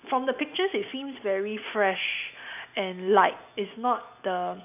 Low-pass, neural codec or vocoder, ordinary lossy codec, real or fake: 3.6 kHz; none; none; real